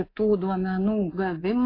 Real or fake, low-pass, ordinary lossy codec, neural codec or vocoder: fake; 5.4 kHz; AAC, 24 kbps; codec, 24 kHz, 3.1 kbps, DualCodec